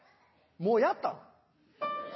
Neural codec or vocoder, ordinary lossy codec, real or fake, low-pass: none; MP3, 24 kbps; real; 7.2 kHz